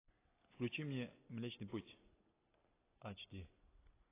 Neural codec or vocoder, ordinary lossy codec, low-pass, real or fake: none; AAC, 16 kbps; 3.6 kHz; real